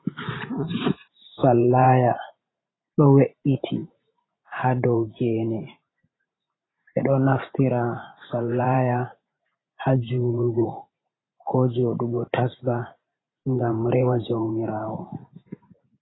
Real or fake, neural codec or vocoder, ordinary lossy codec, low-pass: fake; vocoder, 44.1 kHz, 128 mel bands every 512 samples, BigVGAN v2; AAC, 16 kbps; 7.2 kHz